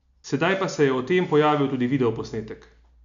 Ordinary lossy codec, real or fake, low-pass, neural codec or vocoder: none; real; 7.2 kHz; none